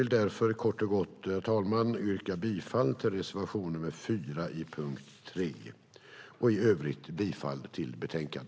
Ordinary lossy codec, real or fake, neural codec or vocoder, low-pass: none; real; none; none